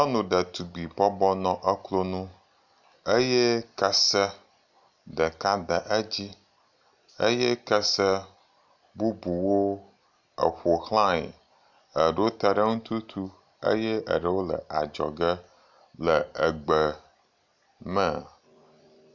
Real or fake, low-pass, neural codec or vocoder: real; 7.2 kHz; none